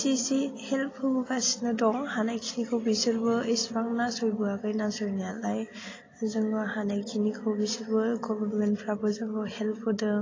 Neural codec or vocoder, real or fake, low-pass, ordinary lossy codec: none; real; 7.2 kHz; AAC, 32 kbps